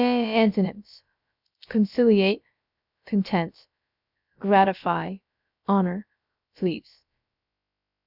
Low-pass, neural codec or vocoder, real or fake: 5.4 kHz; codec, 16 kHz, about 1 kbps, DyCAST, with the encoder's durations; fake